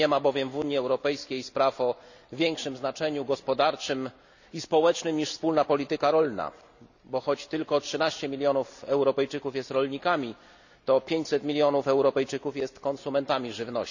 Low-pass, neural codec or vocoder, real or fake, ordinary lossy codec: 7.2 kHz; none; real; none